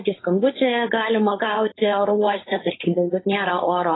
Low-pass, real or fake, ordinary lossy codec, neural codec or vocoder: 7.2 kHz; fake; AAC, 16 kbps; codec, 16 kHz, 4.8 kbps, FACodec